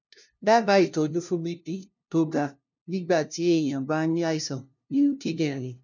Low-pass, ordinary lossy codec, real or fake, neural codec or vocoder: 7.2 kHz; none; fake; codec, 16 kHz, 0.5 kbps, FunCodec, trained on LibriTTS, 25 frames a second